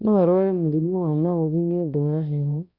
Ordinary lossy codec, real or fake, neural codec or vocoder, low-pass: Opus, 64 kbps; fake; codec, 16 kHz, 1 kbps, X-Codec, HuBERT features, trained on balanced general audio; 5.4 kHz